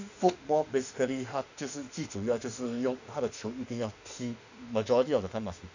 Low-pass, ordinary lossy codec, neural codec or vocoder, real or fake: 7.2 kHz; none; autoencoder, 48 kHz, 32 numbers a frame, DAC-VAE, trained on Japanese speech; fake